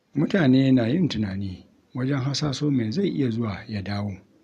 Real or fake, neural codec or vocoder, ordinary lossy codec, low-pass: real; none; none; 14.4 kHz